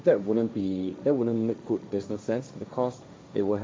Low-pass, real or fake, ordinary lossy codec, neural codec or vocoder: none; fake; none; codec, 16 kHz, 1.1 kbps, Voila-Tokenizer